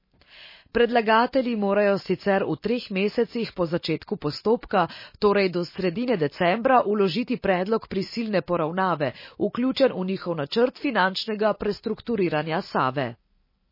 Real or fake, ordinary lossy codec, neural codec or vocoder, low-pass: real; MP3, 24 kbps; none; 5.4 kHz